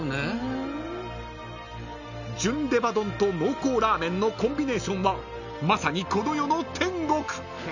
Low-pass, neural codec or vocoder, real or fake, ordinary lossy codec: 7.2 kHz; none; real; none